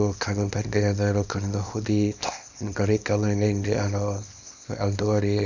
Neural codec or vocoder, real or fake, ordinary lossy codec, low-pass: codec, 24 kHz, 0.9 kbps, WavTokenizer, small release; fake; none; 7.2 kHz